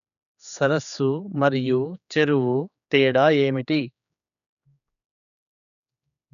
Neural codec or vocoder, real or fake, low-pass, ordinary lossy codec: codec, 16 kHz, 4 kbps, X-Codec, HuBERT features, trained on general audio; fake; 7.2 kHz; none